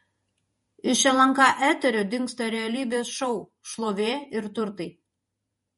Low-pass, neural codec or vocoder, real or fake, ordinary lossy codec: 19.8 kHz; vocoder, 48 kHz, 128 mel bands, Vocos; fake; MP3, 48 kbps